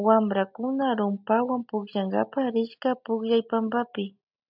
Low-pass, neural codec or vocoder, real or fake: 5.4 kHz; none; real